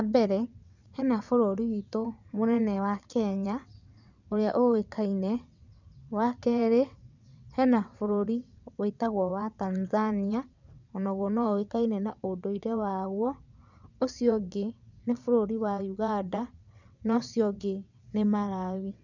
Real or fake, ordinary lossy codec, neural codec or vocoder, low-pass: fake; none; vocoder, 22.05 kHz, 80 mel bands, WaveNeXt; 7.2 kHz